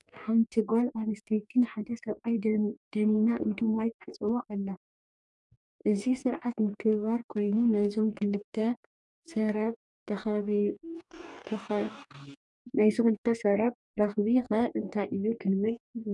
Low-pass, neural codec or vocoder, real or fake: 10.8 kHz; codec, 44.1 kHz, 2.6 kbps, DAC; fake